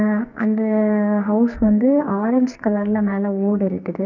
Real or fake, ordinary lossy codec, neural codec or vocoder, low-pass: fake; none; codec, 44.1 kHz, 2.6 kbps, SNAC; 7.2 kHz